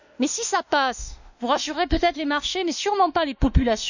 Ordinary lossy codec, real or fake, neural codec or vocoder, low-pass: none; fake; autoencoder, 48 kHz, 32 numbers a frame, DAC-VAE, trained on Japanese speech; 7.2 kHz